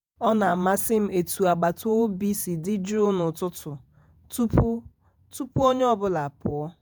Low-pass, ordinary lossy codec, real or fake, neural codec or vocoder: none; none; fake; vocoder, 48 kHz, 128 mel bands, Vocos